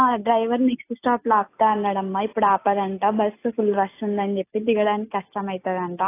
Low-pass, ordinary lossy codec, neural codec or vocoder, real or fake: 3.6 kHz; AAC, 24 kbps; none; real